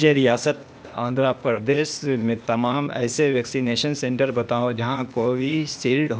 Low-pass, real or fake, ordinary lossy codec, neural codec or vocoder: none; fake; none; codec, 16 kHz, 0.8 kbps, ZipCodec